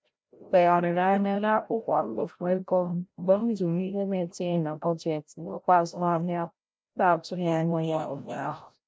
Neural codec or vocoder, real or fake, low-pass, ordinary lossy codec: codec, 16 kHz, 0.5 kbps, FreqCodec, larger model; fake; none; none